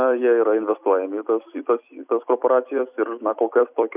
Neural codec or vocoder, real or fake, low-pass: vocoder, 44.1 kHz, 128 mel bands every 256 samples, BigVGAN v2; fake; 3.6 kHz